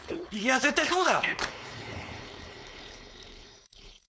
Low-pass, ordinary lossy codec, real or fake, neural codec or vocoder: none; none; fake; codec, 16 kHz, 4.8 kbps, FACodec